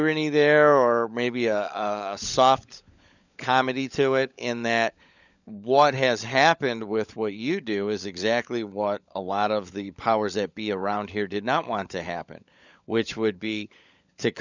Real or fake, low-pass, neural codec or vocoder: fake; 7.2 kHz; codec, 16 kHz, 16 kbps, FunCodec, trained on LibriTTS, 50 frames a second